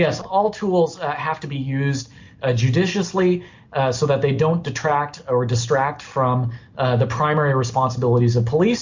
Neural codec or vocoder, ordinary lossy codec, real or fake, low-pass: none; MP3, 48 kbps; real; 7.2 kHz